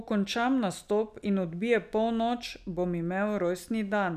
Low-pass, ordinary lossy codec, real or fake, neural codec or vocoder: 14.4 kHz; none; real; none